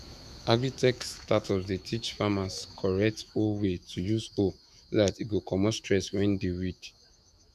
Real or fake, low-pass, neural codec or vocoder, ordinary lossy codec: fake; 14.4 kHz; autoencoder, 48 kHz, 128 numbers a frame, DAC-VAE, trained on Japanese speech; none